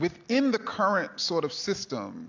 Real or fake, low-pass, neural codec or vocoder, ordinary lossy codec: real; 7.2 kHz; none; AAC, 48 kbps